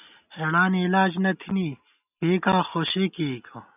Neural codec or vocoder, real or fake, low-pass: none; real; 3.6 kHz